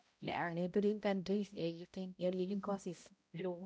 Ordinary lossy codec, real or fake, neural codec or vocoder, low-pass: none; fake; codec, 16 kHz, 0.5 kbps, X-Codec, HuBERT features, trained on balanced general audio; none